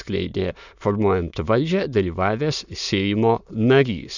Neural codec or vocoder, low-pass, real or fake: autoencoder, 22.05 kHz, a latent of 192 numbers a frame, VITS, trained on many speakers; 7.2 kHz; fake